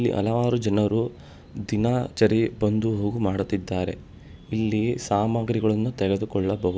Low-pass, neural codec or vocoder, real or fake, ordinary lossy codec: none; none; real; none